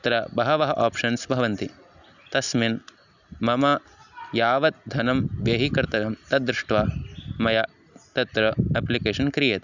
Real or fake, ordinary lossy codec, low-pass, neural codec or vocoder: real; none; 7.2 kHz; none